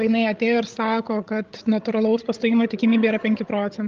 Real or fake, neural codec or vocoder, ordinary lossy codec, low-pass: fake; codec, 16 kHz, 16 kbps, FreqCodec, larger model; Opus, 32 kbps; 7.2 kHz